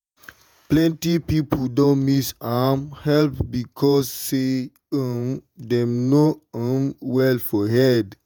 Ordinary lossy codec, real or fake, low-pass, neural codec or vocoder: none; fake; none; vocoder, 48 kHz, 128 mel bands, Vocos